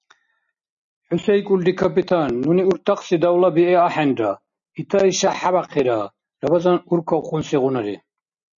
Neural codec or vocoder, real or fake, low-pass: none; real; 7.2 kHz